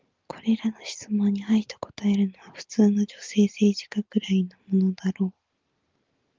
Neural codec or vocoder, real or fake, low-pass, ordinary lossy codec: none; real; 7.2 kHz; Opus, 32 kbps